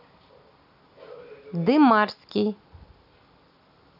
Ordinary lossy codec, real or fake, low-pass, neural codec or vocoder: AAC, 48 kbps; real; 5.4 kHz; none